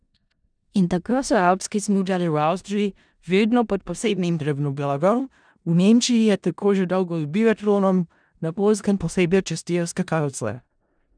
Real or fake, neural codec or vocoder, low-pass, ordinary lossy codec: fake; codec, 16 kHz in and 24 kHz out, 0.4 kbps, LongCat-Audio-Codec, four codebook decoder; 9.9 kHz; none